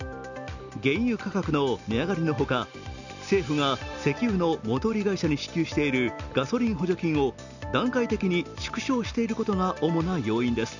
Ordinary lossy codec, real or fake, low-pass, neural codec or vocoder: none; real; 7.2 kHz; none